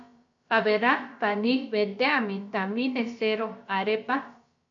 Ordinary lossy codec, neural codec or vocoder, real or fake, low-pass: MP3, 48 kbps; codec, 16 kHz, about 1 kbps, DyCAST, with the encoder's durations; fake; 7.2 kHz